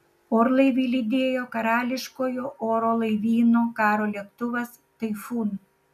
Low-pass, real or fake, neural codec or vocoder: 14.4 kHz; real; none